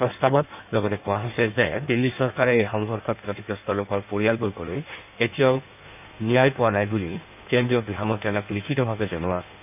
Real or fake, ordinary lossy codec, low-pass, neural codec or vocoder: fake; none; 3.6 kHz; codec, 16 kHz in and 24 kHz out, 1.1 kbps, FireRedTTS-2 codec